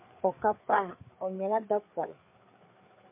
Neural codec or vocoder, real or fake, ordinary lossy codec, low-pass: codec, 16 kHz, 4 kbps, FreqCodec, larger model; fake; MP3, 24 kbps; 3.6 kHz